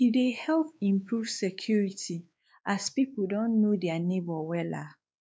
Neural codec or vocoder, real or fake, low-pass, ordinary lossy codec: codec, 16 kHz, 4 kbps, X-Codec, WavLM features, trained on Multilingual LibriSpeech; fake; none; none